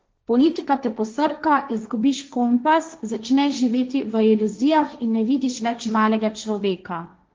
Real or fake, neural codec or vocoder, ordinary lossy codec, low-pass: fake; codec, 16 kHz, 1.1 kbps, Voila-Tokenizer; Opus, 24 kbps; 7.2 kHz